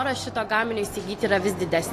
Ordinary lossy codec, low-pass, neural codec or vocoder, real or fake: AAC, 48 kbps; 14.4 kHz; none; real